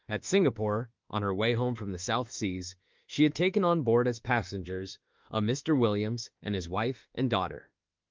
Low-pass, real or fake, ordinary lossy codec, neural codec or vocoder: 7.2 kHz; fake; Opus, 32 kbps; autoencoder, 48 kHz, 32 numbers a frame, DAC-VAE, trained on Japanese speech